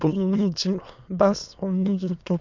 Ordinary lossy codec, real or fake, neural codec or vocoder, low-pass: none; fake; autoencoder, 22.05 kHz, a latent of 192 numbers a frame, VITS, trained on many speakers; 7.2 kHz